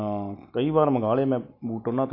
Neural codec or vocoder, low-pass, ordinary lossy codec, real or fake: none; 5.4 kHz; AAC, 32 kbps; real